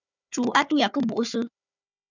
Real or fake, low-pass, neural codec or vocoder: fake; 7.2 kHz; codec, 16 kHz, 4 kbps, FunCodec, trained on Chinese and English, 50 frames a second